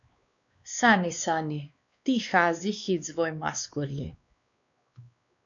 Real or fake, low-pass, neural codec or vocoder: fake; 7.2 kHz; codec, 16 kHz, 2 kbps, X-Codec, WavLM features, trained on Multilingual LibriSpeech